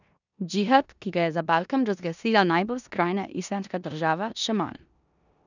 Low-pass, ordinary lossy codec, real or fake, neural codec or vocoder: 7.2 kHz; none; fake; codec, 16 kHz in and 24 kHz out, 0.9 kbps, LongCat-Audio-Codec, four codebook decoder